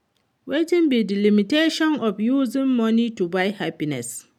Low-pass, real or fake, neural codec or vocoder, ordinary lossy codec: 19.8 kHz; real; none; none